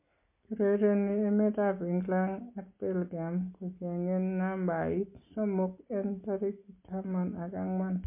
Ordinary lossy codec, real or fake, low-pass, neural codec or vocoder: MP3, 24 kbps; real; 3.6 kHz; none